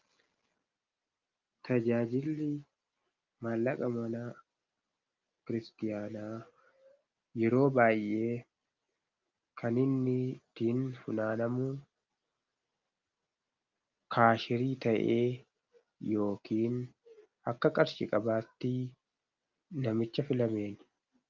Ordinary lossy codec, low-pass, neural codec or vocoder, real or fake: Opus, 24 kbps; 7.2 kHz; none; real